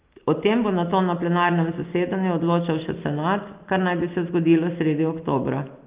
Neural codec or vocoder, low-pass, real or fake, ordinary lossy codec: none; 3.6 kHz; real; Opus, 32 kbps